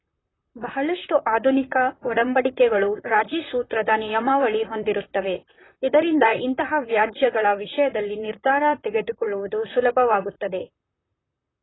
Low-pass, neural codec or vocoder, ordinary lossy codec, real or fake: 7.2 kHz; vocoder, 44.1 kHz, 128 mel bands, Pupu-Vocoder; AAC, 16 kbps; fake